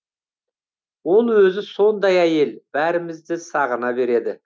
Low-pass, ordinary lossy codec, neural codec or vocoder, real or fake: none; none; none; real